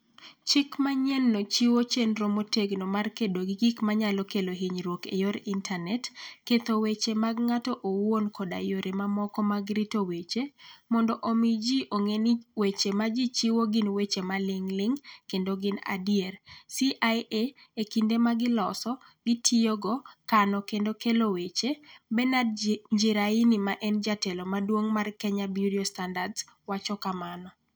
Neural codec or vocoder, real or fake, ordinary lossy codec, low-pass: none; real; none; none